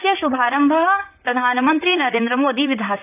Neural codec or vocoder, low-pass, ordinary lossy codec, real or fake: vocoder, 44.1 kHz, 128 mel bands, Pupu-Vocoder; 3.6 kHz; AAC, 32 kbps; fake